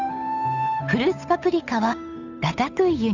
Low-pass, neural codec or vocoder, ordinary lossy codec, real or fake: 7.2 kHz; codec, 16 kHz, 8 kbps, FunCodec, trained on Chinese and English, 25 frames a second; none; fake